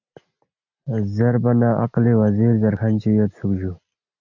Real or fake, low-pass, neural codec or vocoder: real; 7.2 kHz; none